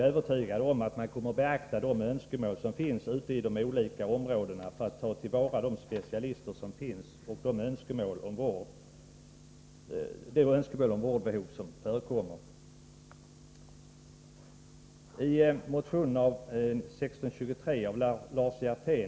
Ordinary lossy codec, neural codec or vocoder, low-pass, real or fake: none; none; none; real